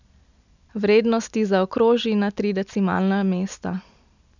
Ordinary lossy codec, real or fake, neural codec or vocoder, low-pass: none; real; none; 7.2 kHz